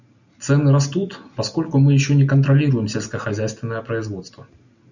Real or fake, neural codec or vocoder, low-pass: real; none; 7.2 kHz